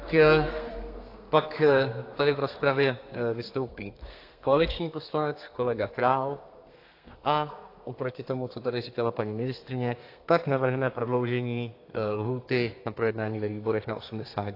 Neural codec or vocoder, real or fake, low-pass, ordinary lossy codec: codec, 32 kHz, 1.9 kbps, SNAC; fake; 5.4 kHz; AAC, 32 kbps